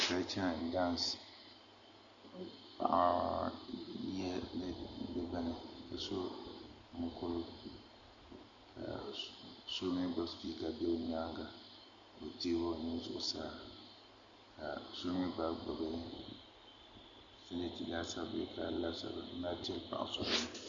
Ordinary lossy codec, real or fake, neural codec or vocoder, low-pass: Opus, 64 kbps; real; none; 7.2 kHz